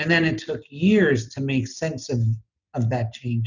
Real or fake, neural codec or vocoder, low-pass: real; none; 7.2 kHz